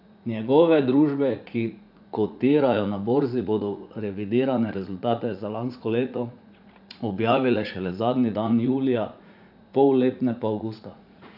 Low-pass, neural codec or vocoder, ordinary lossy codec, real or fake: 5.4 kHz; vocoder, 44.1 kHz, 80 mel bands, Vocos; AAC, 48 kbps; fake